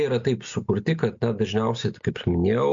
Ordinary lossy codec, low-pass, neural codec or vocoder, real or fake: MP3, 48 kbps; 7.2 kHz; none; real